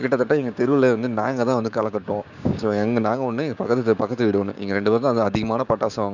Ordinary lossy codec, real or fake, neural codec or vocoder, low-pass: none; fake; codec, 16 kHz, 6 kbps, DAC; 7.2 kHz